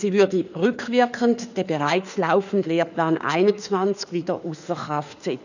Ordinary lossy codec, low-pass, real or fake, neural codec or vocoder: none; 7.2 kHz; fake; autoencoder, 48 kHz, 32 numbers a frame, DAC-VAE, trained on Japanese speech